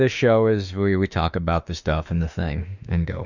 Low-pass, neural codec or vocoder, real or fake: 7.2 kHz; autoencoder, 48 kHz, 32 numbers a frame, DAC-VAE, trained on Japanese speech; fake